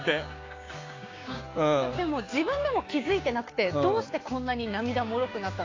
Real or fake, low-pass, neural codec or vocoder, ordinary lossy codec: fake; 7.2 kHz; codec, 16 kHz, 6 kbps, DAC; AAC, 32 kbps